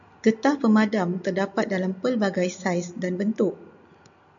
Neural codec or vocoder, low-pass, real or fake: none; 7.2 kHz; real